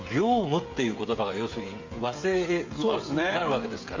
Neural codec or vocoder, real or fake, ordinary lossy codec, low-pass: codec, 16 kHz, 16 kbps, FreqCodec, smaller model; fake; MP3, 48 kbps; 7.2 kHz